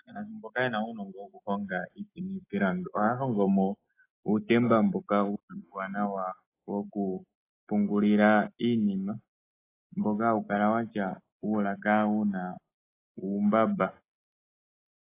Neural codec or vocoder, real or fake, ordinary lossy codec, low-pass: none; real; AAC, 24 kbps; 3.6 kHz